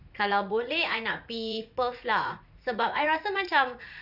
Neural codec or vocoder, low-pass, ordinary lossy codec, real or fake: vocoder, 44.1 kHz, 80 mel bands, Vocos; 5.4 kHz; none; fake